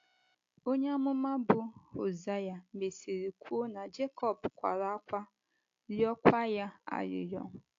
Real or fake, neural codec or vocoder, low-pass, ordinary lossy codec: real; none; 7.2 kHz; AAC, 48 kbps